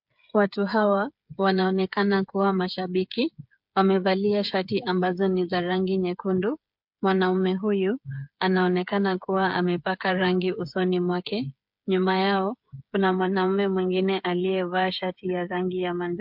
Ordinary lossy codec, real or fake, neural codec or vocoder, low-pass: MP3, 48 kbps; fake; codec, 16 kHz, 8 kbps, FreqCodec, smaller model; 5.4 kHz